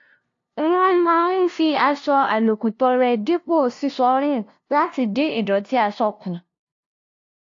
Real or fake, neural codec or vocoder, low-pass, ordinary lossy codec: fake; codec, 16 kHz, 0.5 kbps, FunCodec, trained on LibriTTS, 25 frames a second; 7.2 kHz; AAC, 48 kbps